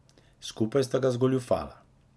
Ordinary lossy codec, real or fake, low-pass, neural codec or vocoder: none; real; none; none